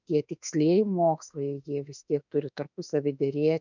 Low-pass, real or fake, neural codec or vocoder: 7.2 kHz; fake; autoencoder, 48 kHz, 32 numbers a frame, DAC-VAE, trained on Japanese speech